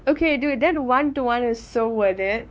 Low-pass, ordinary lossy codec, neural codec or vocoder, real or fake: none; none; codec, 16 kHz, 4 kbps, X-Codec, WavLM features, trained on Multilingual LibriSpeech; fake